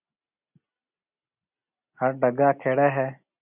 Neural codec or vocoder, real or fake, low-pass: none; real; 3.6 kHz